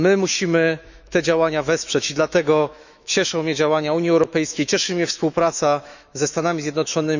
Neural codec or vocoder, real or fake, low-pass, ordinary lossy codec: autoencoder, 48 kHz, 128 numbers a frame, DAC-VAE, trained on Japanese speech; fake; 7.2 kHz; none